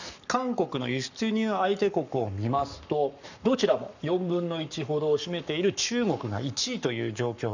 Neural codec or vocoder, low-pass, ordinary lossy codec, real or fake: codec, 44.1 kHz, 7.8 kbps, Pupu-Codec; 7.2 kHz; none; fake